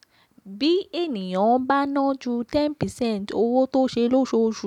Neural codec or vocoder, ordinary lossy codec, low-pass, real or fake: none; none; 19.8 kHz; real